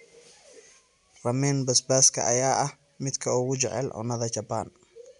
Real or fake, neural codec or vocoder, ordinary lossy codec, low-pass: real; none; none; 10.8 kHz